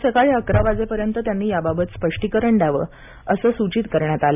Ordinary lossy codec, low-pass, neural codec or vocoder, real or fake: none; 3.6 kHz; none; real